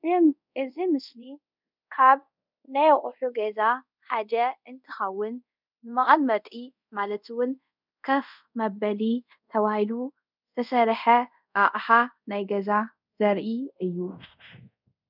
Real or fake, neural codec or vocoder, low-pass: fake; codec, 24 kHz, 0.5 kbps, DualCodec; 5.4 kHz